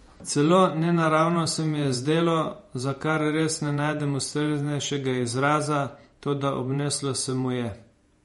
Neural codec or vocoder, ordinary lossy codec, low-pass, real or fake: vocoder, 48 kHz, 128 mel bands, Vocos; MP3, 48 kbps; 19.8 kHz; fake